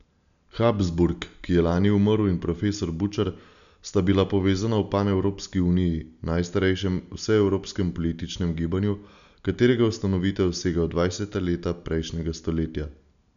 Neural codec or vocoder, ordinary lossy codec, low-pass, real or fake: none; none; 7.2 kHz; real